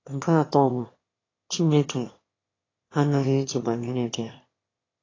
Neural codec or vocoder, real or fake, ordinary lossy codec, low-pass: autoencoder, 22.05 kHz, a latent of 192 numbers a frame, VITS, trained on one speaker; fake; AAC, 32 kbps; 7.2 kHz